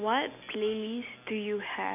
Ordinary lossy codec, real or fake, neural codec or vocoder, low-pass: AAC, 32 kbps; real; none; 3.6 kHz